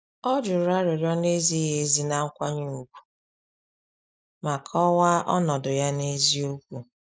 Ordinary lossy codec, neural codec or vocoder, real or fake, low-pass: none; none; real; none